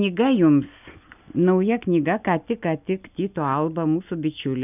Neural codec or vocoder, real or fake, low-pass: none; real; 3.6 kHz